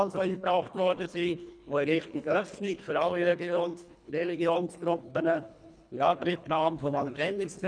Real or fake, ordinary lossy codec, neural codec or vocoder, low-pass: fake; none; codec, 24 kHz, 1.5 kbps, HILCodec; 9.9 kHz